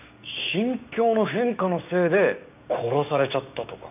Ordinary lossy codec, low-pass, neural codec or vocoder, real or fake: none; 3.6 kHz; codec, 16 kHz, 6 kbps, DAC; fake